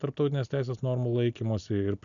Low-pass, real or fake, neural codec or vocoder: 7.2 kHz; real; none